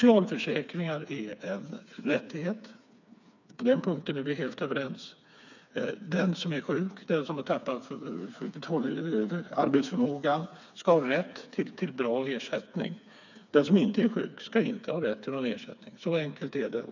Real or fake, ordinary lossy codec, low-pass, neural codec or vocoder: fake; none; 7.2 kHz; codec, 16 kHz, 4 kbps, FreqCodec, smaller model